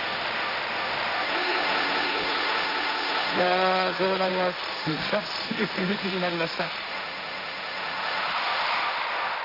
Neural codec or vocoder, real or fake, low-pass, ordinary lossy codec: codec, 16 kHz, 1.1 kbps, Voila-Tokenizer; fake; 5.4 kHz; none